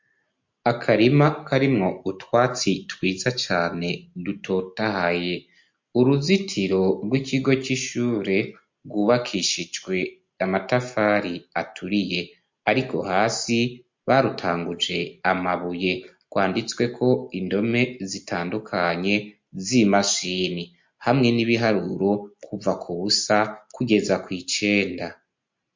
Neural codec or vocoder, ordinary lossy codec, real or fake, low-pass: none; MP3, 48 kbps; real; 7.2 kHz